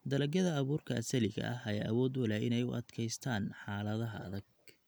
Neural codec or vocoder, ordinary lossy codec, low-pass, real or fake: none; none; none; real